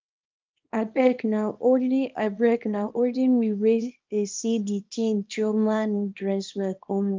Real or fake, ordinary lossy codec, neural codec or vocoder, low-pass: fake; Opus, 32 kbps; codec, 24 kHz, 0.9 kbps, WavTokenizer, small release; 7.2 kHz